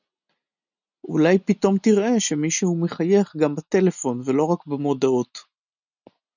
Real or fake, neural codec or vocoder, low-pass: real; none; 7.2 kHz